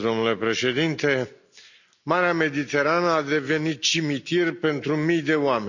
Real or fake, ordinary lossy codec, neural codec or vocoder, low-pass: real; none; none; 7.2 kHz